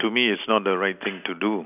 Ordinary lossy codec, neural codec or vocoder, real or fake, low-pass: none; none; real; 3.6 kHz